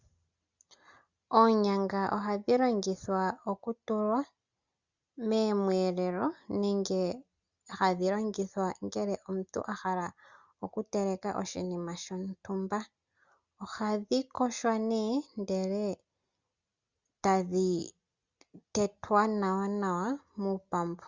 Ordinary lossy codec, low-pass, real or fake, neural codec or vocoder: Opus, 64 kbps; 7.2 kHz; real; none